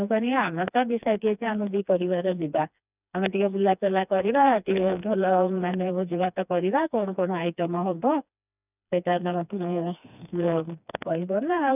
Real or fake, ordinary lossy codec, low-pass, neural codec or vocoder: fake; none; 3.6 kHz; codec, 16 kHz, 2 kbps, FreqCodec, smaller model